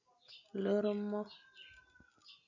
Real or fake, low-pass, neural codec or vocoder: real; 7.2 kHz; none